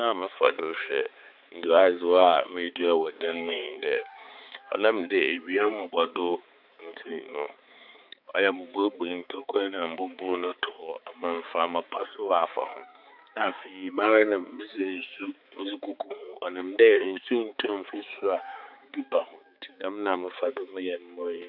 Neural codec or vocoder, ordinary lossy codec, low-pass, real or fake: codec, 16 kHz, 4 kbps, X-Codec, HuBERT features, trained on balanced general audio; none; 5.4 kHz; fake